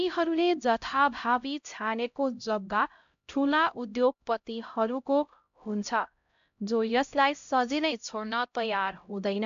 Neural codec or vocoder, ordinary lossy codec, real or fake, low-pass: codec, 16 kHz, 0.5 kbps, X-Codec, HuBERT features, trained on LibriSpeech; AAC, 64 kbps; fake; 7.2 kHz